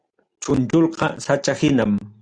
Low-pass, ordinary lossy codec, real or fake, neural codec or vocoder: 9.9 kHz; Opus, 64 kbps; real; none